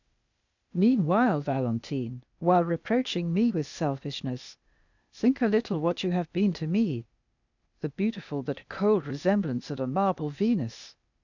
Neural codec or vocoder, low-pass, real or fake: codec, 16 kHz, 0.8 kbps, ZipCodec; 7.2 kHz; fake